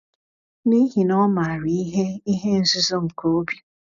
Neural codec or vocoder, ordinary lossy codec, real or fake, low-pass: none; none; real; 7.2 kHz